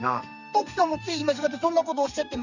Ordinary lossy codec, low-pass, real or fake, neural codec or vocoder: none; 7.2 kHz; fake; codec, 32 kHz, 1.9 kbps, SNAC